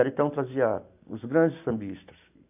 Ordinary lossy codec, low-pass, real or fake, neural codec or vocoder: AAC, 32 kbps; 3.6 kHz; real; none